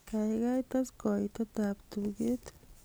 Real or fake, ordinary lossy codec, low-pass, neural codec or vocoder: real; none; none; none